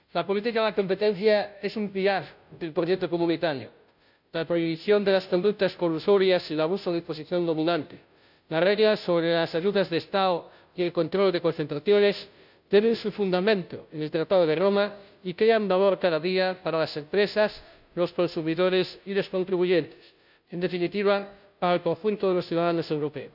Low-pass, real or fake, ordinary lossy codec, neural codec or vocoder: 5.4 kHz; fake; none; codec, 16 kHz, 0.5 kbps, FunCodec, trained on Chinese and English, 25 frames a second